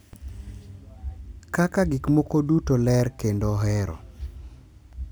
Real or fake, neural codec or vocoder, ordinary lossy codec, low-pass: real; none; none; none